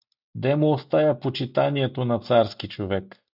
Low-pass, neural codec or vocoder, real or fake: 5.4 kHz; none; real